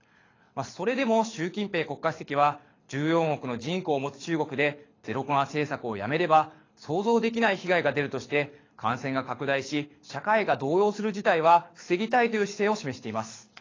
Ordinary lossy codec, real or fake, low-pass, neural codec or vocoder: AAC, 32 kbps; fake; 7.2 kHz; codec, 24 kHz, 6 kbps, HILCodec